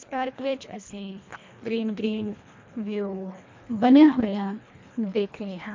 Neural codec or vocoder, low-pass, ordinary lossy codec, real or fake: codec, 24 kHz, 1.5 kbps, HILCodec; 7.2 kHz; MP3, 64 kbps; fake